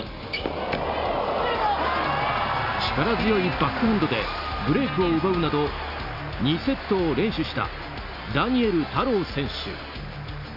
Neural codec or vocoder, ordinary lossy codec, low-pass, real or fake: none; none; 5.4 kHz; real